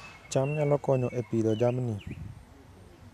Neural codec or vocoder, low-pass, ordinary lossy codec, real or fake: none; 14.4 kHz; none; real